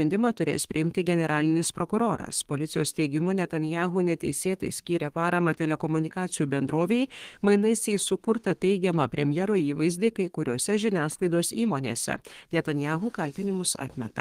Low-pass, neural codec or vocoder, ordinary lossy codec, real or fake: 14.4 kHz; codec, 32 kHz, 1.9 kbps, SNAC; Opus, 24 kbps; fake